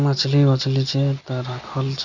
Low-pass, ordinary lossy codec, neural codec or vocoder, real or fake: 7.2 kHz; none; none; real